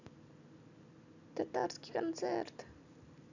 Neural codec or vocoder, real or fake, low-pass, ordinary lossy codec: none; real; 7.2 kHz; none